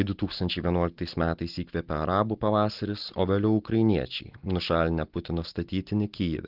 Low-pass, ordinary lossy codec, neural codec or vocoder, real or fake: 5.4 kHz; Opus, 16 kbps; none; real